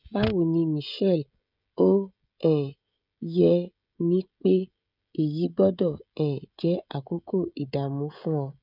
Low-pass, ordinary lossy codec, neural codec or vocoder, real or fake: 5.4 kHz; none; codec, 16 kHz, 16 kbps, FreqCodec, smaller model; fake